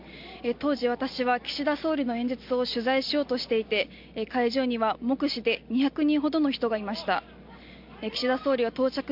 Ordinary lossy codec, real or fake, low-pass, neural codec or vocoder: MP3, 48 kbps; real; 5.4 kHz; none